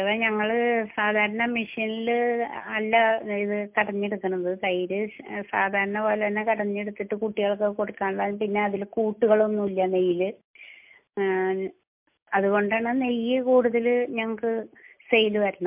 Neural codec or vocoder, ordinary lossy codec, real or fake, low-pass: none; MP3, 32 kbps; real; 3.6 kHz